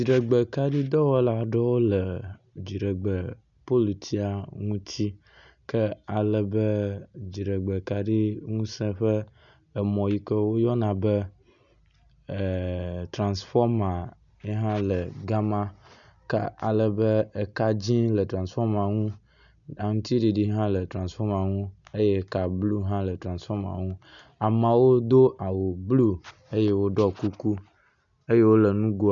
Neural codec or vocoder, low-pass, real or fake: none; 7.2 kHz; real